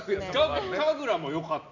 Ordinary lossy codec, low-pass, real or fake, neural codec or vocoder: none; 7.2 kHz; real; none